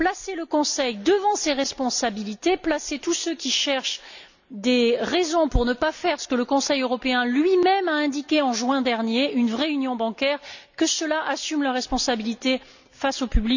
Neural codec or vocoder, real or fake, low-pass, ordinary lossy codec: none; real; 7.2 kHz; none